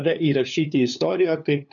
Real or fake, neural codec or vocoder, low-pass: fake; codec, 16 kHz, 2 kbps, FunCodec, trained on LibriTTS, 25 frames a second; 7.2 kHz